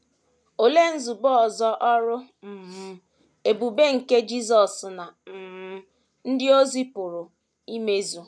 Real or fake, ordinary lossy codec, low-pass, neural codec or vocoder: real; none; none; none